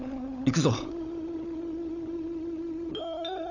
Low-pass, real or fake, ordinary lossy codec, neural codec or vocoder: 7.2 kHz; fake; none; codec, 16 kHz, 16 kbps, FunCodec, trained on LibriTTS, 50 frames a second